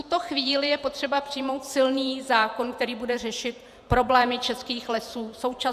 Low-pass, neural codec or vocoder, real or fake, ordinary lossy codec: 14.4 kHz; vocoder, 48 kHz, 128 mel bands, Vocos; fake; MP3, 96 kbps